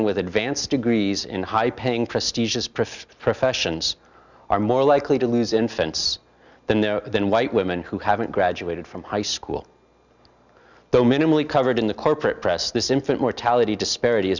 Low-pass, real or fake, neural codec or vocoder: 7.2 kHz; real; none